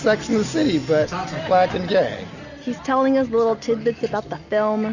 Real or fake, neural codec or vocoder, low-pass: real; none; 7.2 kHz